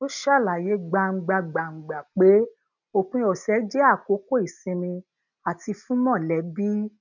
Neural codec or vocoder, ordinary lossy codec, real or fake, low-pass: none; none; real; 7.2 kHz